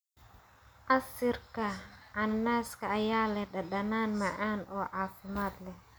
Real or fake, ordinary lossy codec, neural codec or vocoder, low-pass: real; none; none; none